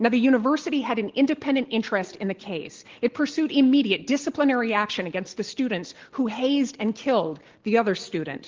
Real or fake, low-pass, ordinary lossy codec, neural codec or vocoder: real; 7.2 kHz; Opus, 16 kbps; none